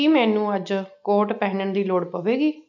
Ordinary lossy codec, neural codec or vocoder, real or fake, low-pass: none; none; real; 7.2 kHz